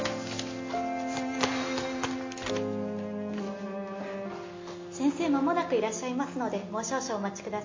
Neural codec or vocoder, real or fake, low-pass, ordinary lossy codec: none; real; 7.2 kHz; MP3, 32 kbps